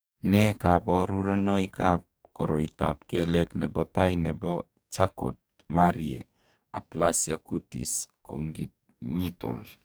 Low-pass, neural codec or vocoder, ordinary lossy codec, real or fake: none; codec, 44.1 kHz, 2.6 kbps, DAC; none; fake